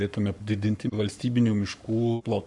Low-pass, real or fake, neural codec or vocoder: 10.8 kHz; fake; codec, 44.1 kHz, 7.8 kbps, Pupu-Codec